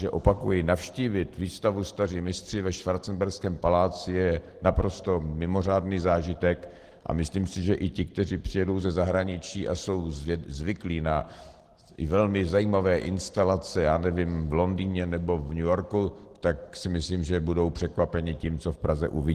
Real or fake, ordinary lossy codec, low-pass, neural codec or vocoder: real; Opus, 16 kbps; 14.4 kHz; none